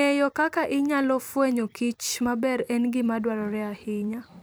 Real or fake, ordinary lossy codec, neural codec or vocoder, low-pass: fake; none; vocoder, 44.1 kHz, 128 mel bands every 256 samples, BigVGAN v2; none